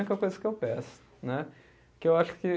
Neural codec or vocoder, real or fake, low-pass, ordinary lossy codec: none; real; none; none